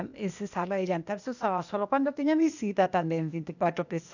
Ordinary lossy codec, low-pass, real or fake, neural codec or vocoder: none; 7.2 kHz; fake; codec, 16 kHz, 0.8 kbps, ZipCodec